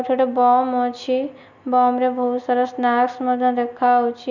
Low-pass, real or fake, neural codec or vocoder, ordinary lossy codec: 7.2 kHz; real; none; none